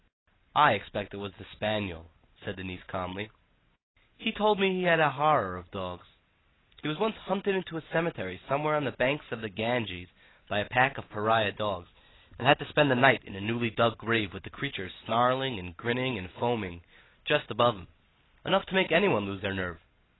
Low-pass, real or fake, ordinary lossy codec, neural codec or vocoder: 7.2 kHz; real; AAC, 16 kbps; none